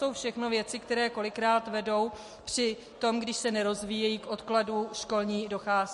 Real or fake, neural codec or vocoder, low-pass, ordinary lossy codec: real; none; 14.4 kHz; MP3, 48 kbps